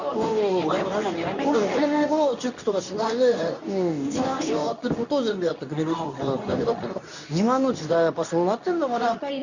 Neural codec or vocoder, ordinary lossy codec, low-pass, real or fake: codec, 24 kHz, 0.9 kbps, WavTokenizer, medium speech release version 2; none; 7.2 kHz; fake